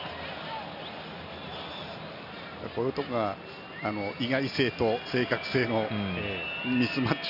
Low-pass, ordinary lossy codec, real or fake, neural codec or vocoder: 5.4 kHz; none; real; none